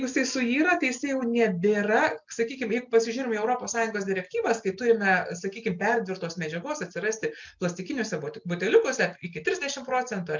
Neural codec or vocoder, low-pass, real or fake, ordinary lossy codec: none; 7.2 kHz; real; MP3, 64 kbps